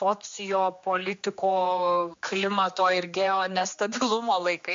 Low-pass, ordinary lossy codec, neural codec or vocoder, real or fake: 7.2 kHz; MP3, 48 kbps; codec, 16 kHz, 2 kbps, X-Codec, HuBERT features, trained on general audio; fake